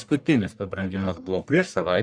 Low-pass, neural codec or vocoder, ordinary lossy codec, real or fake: 9.9 kHz; codec, 44.1 kHz, 1.7 kbps, Pupu-Codec; Opus, 64 kbps; fake